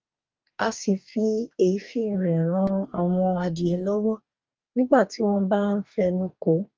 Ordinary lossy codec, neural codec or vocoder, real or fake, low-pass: Opus, 24 kbps; codec, 44.1 kHz, 2.6 kbps, DAC; fake; 7.2 kHz